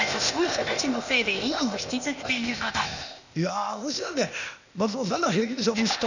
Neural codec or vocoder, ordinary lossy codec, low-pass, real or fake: codec, 16 kHz, 0.8 kbps, ZipCodec; none; 7.2 kHz; fake